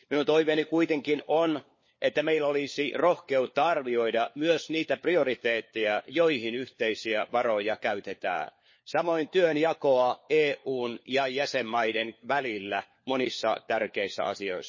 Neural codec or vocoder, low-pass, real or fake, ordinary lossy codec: codec, 16 kHz, 4 kbps, FunCodec, trained on LibriTTS, 50 frames a second; 7.2 kHz; fake; MP3, 32 kbps